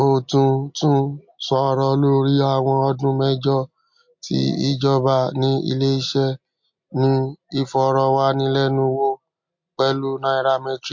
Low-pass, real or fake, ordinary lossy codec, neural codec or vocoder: 7.2 kHz; real; MP3, 48 kbps; none